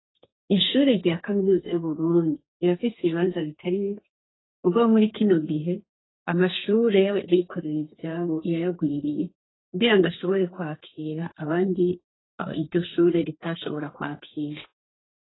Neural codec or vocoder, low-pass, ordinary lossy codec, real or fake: codec, 32 kHz, 1.9 kbps, SNAC; 7.2 kHz; AAC, 16 kbps; fake